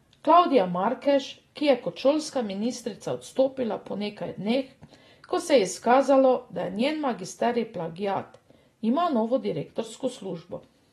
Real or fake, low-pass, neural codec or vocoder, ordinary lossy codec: real; 19.8 kHz; none; AAC, 32 kbps